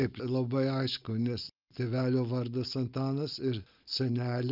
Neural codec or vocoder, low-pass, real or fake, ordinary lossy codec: none; 5.4 kHz; real; Opus, 24 kbps